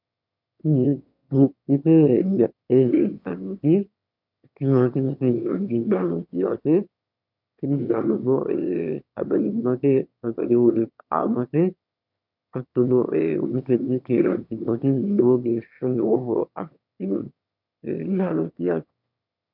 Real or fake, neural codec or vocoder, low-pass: fake; autoencoder, 22.05 kHz, a latent of 192 numbers a frame, VITS, trained on one speaker; 5.4 kHz